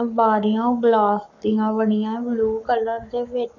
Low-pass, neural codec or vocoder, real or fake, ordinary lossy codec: 7.2 kHz; codec, 44.1 kHz, 7.8 kbps, Pupu-Codec; fake; none